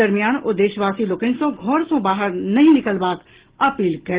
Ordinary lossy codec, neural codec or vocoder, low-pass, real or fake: Opus, 16 kbps; none; 3.6 kHz; real